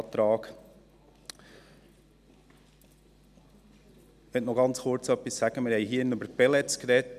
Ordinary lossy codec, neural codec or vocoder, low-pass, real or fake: none; none; 14.4 kHz; real